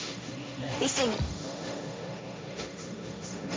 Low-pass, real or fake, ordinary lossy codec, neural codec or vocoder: none; fake; none; codec, 16 kHz, 1.1 kbps, Voila-Tokenizer